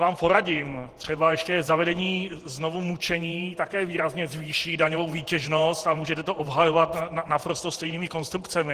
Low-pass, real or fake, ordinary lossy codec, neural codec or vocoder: 14.4 kHz; fake; Opus, 16 kbps; vocoder, 48 kHz, 128 mel bands, Vocos